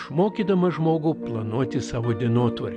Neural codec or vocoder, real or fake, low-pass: none; real; 10.8 kHz